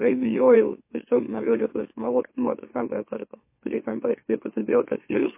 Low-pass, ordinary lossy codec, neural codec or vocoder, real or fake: 3.6 kHz; MP3, 24 kbps; autoencoder, 44.1 kHz, a latent of 192 numbers a frame, MeloTTS; fake